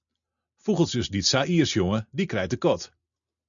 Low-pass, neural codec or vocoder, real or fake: 7.2 kHz; none; real